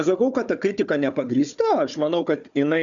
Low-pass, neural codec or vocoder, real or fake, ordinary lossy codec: 7.2 kHz; codec, 16 kHz, 4 kbps, FunCodec, trained on Chinese and English, 50 frames a second; fake; MP3, 96 kbps